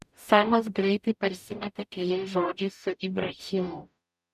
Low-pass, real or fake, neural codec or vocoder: 14.4 kHz; fake; codec, 44.1 kHz, 0.9 kbps, DAC